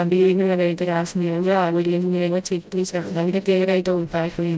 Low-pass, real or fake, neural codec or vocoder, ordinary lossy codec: none; fake; codec, 16 kHz, 0.5 kbps, FreqCodec, smaller model; none